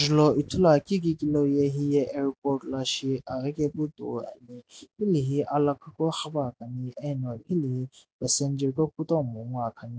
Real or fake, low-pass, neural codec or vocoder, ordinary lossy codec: real; none; none; none